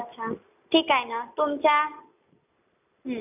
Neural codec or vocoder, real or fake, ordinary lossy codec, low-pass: none; real; none; 3.6 kHz